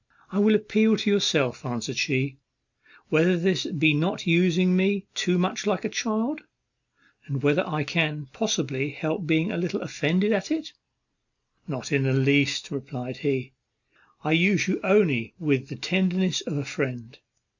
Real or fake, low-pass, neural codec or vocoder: real; 7.2 kHz; none